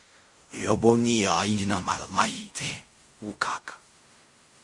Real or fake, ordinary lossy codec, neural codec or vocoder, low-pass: fake; MP3, 48 kbps; codec, 16 kHz in and 24 kHz out, 0.4 kbps, LongCat-Audio-Codec, fine tuned four codebook decoder; 10.8 kHz